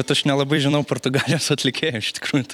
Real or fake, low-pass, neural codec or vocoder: fake; 19.8 kHz; vocoder, 44.1 kHz, 128 mel bands every 256 samples, BigVGAN v2